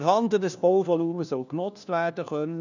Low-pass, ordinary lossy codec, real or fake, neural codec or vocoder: 7.2 kHz; none; fake; codec, 16 kHz, 1 kbps, FunCodec, trained on LibriTTS, 50 frames a second